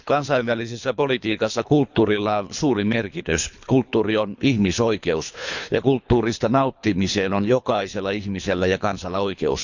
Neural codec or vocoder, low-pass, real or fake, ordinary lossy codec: codec, 24 kHz, 3 kbps, HILCodec; 7.2 kHz; fake; none